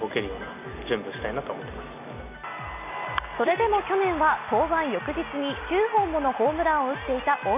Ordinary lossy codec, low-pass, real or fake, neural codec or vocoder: none; 3.6 kHz; real; none